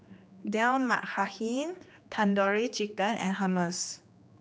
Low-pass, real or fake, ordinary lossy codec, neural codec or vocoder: none; fake; none; codec, 16 kHz, 2 kbps, X-Codec, HuBERT features, trained on general audio